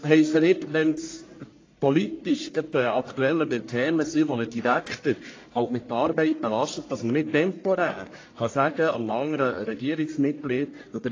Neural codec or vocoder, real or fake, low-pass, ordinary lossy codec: codec, 44.1 kHz, 1.7 kbps, Pupu-Codec; fake; 7.2 kHz; AAC, 32 kbps